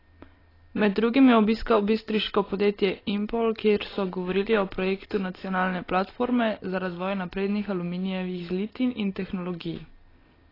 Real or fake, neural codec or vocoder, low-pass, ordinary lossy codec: real; none; 5.4 kHz; AAC, 24 kbps